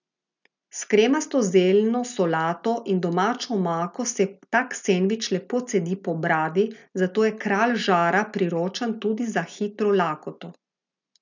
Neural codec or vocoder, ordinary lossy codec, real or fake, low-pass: none; none; real; 7.2 kHz